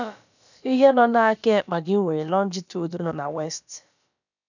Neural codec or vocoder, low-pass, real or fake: codec, 16 kHz, about 1 kbps, DyCAST, with the encoder's durations; 7.2 kHz; fake